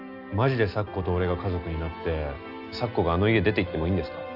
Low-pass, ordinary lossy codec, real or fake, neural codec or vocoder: 5.4 kHz; none; real; none